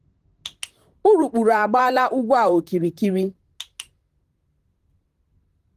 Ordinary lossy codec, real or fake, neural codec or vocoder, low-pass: Opus, 24 kbps; fake; vocoder, 44.1 kHz, 128 mel bands, Pupu-Vocoder; 14.4 kHz